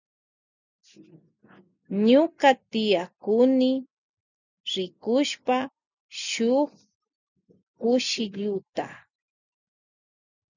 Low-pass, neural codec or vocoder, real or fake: 7.2 kHz; none; real